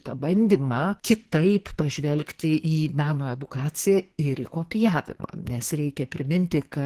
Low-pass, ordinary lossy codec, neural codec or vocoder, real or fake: 14.4 kHz; Opus, 16 kbps; codec, 44.1 kHz, 2.6 kbps, SNAC; fake